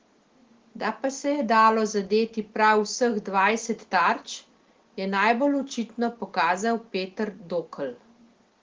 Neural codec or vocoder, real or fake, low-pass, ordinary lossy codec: none; real; 7.2 kHz; Opus, 16 kbps